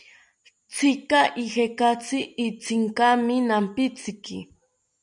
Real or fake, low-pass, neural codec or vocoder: real; 9.9 kHz; none